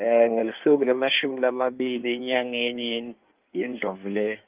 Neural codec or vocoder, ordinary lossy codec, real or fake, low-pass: codec, 16 kHz in and 24 kHz out, 1.1 kbps, FireRedTTS-2 codec; Opus, 24 kbps; fake; 3.6 kHz